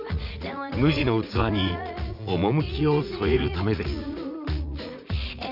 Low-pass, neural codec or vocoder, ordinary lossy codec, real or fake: 5.4 kHz; vocoder, 44.1 kHz, 80 mel bands, Vocos; none; fake